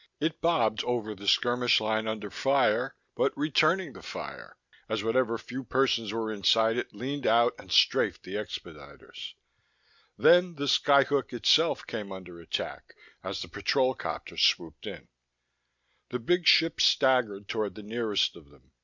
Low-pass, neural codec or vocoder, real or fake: 7.2 kHz; none; real